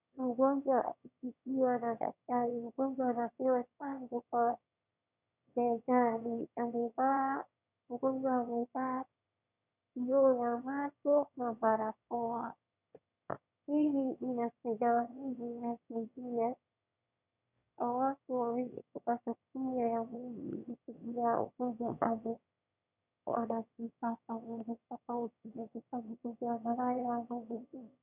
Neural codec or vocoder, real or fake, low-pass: autoencoder, 22.05 kHz, a latent of 192 numbers a frame, VITS, trained on one speaker; fake; 3.6 kHz